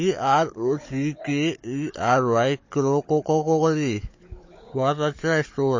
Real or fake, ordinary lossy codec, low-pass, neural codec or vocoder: fake; MP3, 32 kbps; 7.2 kHz; codec, 16 kHz, 16 kbps, FunCodec, trained on Chinese and English, 50 frames a second